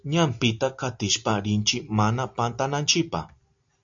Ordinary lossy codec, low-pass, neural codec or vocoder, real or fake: AAC, 64 kbps; 7.2 kHz; none; real